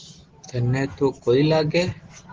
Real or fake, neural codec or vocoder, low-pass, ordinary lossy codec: real; none; 7.2 kHz; Opus, 16 kbps